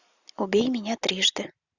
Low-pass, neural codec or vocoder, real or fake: 7.2 kHz; none; real